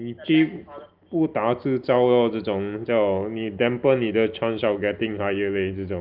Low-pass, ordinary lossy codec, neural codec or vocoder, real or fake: 5.4 kHz; Opus, 32 kbps; none; real